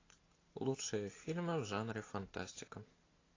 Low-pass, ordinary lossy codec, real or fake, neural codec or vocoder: 7.2 kHz; AAC, 32 kbps; real; none